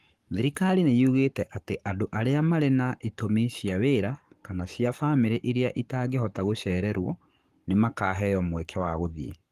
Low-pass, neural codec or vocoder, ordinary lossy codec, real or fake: 14.4 kHz; codec, 44.1 kHz, 7.8 kbps, Pupu-Codec; Opus, 24 kbps; fake